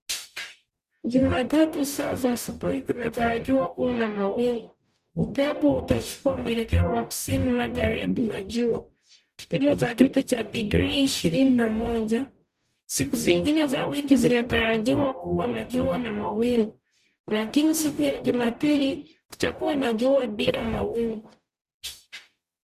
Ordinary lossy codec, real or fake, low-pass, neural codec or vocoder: Opus, 64 kbps; fake; 14.4 kHz; codec, 44.1 kHz, 0.9 kbps, DAC